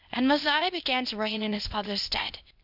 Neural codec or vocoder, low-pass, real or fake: codec, 16 kHz, 0.8 kbps, ZipCodec; 5.4 kHz; fake